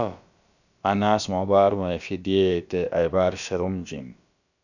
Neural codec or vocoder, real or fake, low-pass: codec, 16 kHz, about 1 kbps, DyCAST, with the encoder's durations; fake; 7.2 kHz